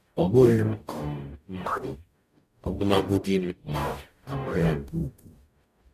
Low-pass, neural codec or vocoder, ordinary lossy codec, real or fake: 14.4 kHz; codec, 44.1 kHz, 0.9 kbps, DAC; none; fake